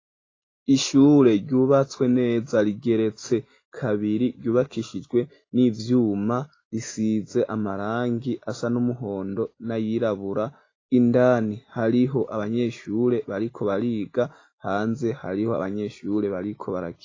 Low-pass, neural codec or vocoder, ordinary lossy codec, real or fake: 7.2 kHz; none; AAC, 32 kbps; real